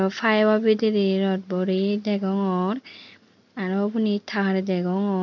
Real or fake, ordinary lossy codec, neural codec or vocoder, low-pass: real; none; none; 7.2 kHz